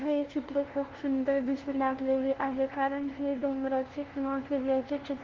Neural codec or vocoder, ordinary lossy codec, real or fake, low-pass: codec, 16 kHz, 0.5 kbps, FunCodec, trained on LibriTTS, 25 frames a second; Opus, 16 kbps; fake; 7.2 kHz